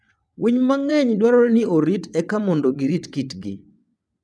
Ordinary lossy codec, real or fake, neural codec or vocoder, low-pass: none; fake; vocoder, 22.05 kHz, 80 mel bands, WaveNeXt; none